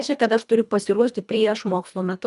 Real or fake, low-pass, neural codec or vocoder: fake; 10.8 kHz; codec, 24 kHz, 1.5 kbps, HILCodec